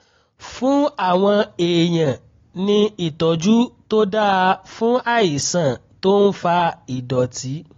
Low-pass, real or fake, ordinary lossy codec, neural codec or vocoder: 7.2 kHz; real; AAC, 32 kbps; none